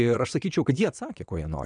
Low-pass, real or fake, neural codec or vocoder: 9.9 kHz; fake; vocoder, 22.05 kHz, 80 mel bands, WaveNeXt